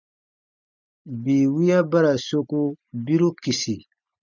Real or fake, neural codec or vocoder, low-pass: real; none; 7.2 kHz